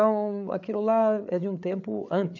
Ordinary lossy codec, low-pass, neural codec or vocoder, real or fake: none; 7.2 kHz; codec, 16 kHz, 8 kbps, FreqCodec, larger model; fake